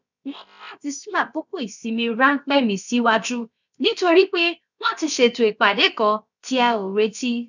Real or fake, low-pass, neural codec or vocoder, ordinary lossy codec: fake; 7.2 kHz; codec, 16 kHz, about 1 kbps, DyCAST, with the encoder's durations; none